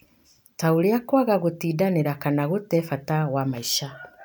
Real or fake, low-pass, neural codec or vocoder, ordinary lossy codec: real; none; none; none